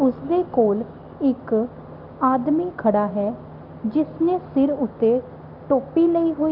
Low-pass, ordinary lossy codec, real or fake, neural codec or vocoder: 5.4 kHz; Opus, 24 kbps; real; none